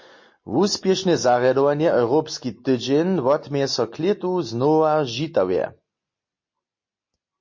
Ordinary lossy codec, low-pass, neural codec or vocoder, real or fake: MP3, 32 kbps; 7.2 kHz; none; real